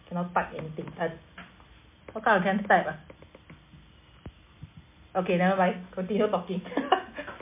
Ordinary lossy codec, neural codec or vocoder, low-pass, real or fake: MP3, 24 kbps; none; 3.6 kHz; real